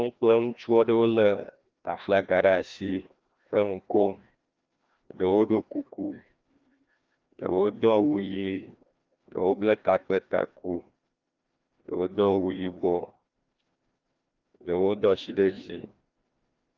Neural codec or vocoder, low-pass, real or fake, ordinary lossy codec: codec, 16 kHz, 1 kbps, FreqCodec, larger model; 7.2 kHz; fake; Opus, 32 kbps